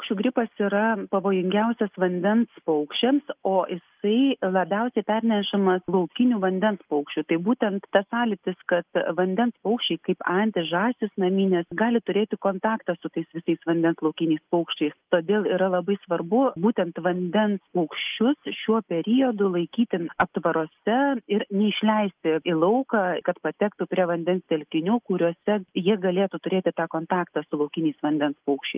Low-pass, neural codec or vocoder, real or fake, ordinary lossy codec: 3.6 kHz; none; real; Opus, 24 kbps